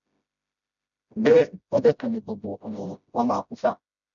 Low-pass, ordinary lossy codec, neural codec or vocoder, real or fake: 7.2 kHz; none; codec, 16 kHz, 0.5 kbps, FreqCodec, smaller model; fake